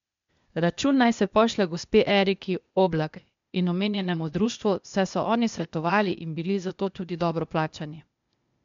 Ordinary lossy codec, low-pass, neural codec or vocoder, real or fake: MP3, 64 kbps; 7.2 kHz; codec, 16 kHz, 0.8 kbps, ZipCodec; fake